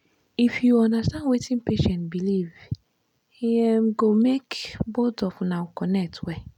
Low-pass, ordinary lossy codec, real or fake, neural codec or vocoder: 19.8 kHz; none; real; none